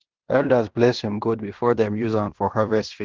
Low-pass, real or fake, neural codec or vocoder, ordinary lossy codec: 7.2 kHz; fake; codec, 16 kHz in and 24 kHz out, 0.9 kbps, LongCat-Audio-Codec, fine tuned four codebook decoder; Opus, 16 kbps